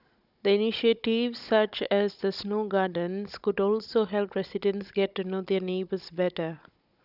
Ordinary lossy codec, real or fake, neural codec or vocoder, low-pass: none; fake; codec, 16 kHz, 16 kbps, FunCodec, trained on Chinese and English, 50 frames a second; 5.4 kHz